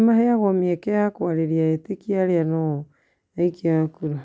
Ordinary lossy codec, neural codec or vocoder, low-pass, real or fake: none; none; none; real